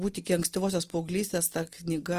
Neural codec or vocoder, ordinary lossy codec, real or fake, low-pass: none; Opus, 24 kbps; real; 14.4 kHz